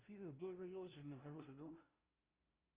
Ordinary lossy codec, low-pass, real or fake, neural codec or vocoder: Opus, 24 kbps; 3.6 kHz; fake; codec, 16 kHz, 0.5 kbps, FunCodec, trained on LibriTTS, 25 frames a second